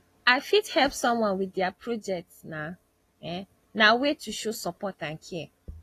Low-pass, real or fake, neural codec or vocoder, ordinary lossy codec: 14.4 kHz; real; none; AAC, 48 kbps